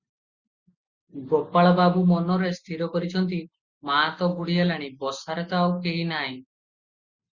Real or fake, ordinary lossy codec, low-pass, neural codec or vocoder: real; Opus, 64 kbps; 7.2 kHz; none